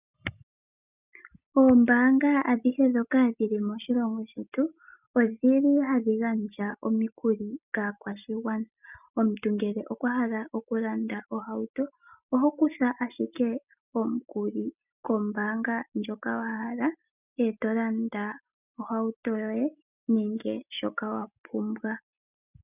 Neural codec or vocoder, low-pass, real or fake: none; 3.6 kHz; real